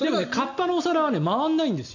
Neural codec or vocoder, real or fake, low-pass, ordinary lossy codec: none; real; 7.2 kHz; none